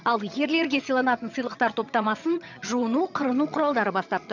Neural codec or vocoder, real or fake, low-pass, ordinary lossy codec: vocoder, 22.05 kHz, 80 mel bands, HiFi-GAN; fake; 7.2 kHz; none